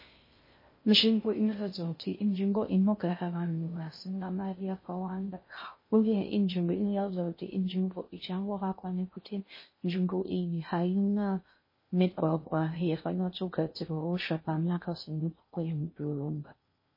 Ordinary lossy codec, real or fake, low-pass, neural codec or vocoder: MP3, 24 kbps; fake; 5.4 kHz; codec, 16 kHz in and 24 kHz out, 0.6 kbps, FocalCodec, streaming, 2048 codes